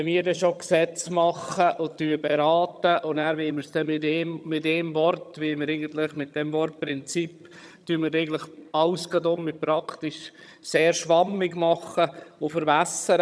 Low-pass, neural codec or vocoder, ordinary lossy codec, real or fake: none; vocoder, 22.05 kHz, 80 mel bands, HiFi-GAN; none; fake